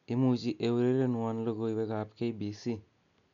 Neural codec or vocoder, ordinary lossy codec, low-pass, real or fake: none; none; 7.2 kHz; real